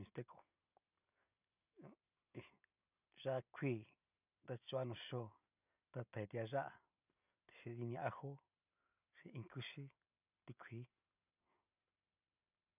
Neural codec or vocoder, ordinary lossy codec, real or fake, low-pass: none; none; real; 3.6 kHz